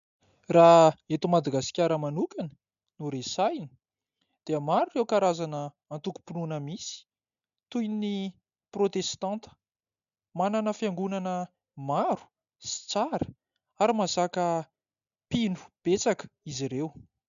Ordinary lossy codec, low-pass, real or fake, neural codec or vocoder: MP3, 96 kbps; 7.2 kHz; real; none